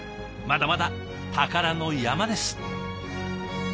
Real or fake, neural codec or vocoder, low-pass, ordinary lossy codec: real; none; none; none